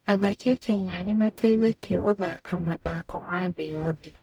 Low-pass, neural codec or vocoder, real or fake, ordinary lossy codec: none; codec, 44.1 kHz, 0.9 kbps, DAC; fake; none